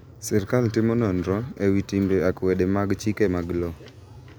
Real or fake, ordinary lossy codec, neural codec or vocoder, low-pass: real; none; none; none